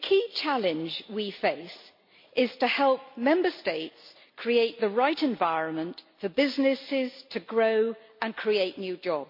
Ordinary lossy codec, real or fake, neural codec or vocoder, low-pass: MP3, 32 kbps; real; none; 5.4 kHz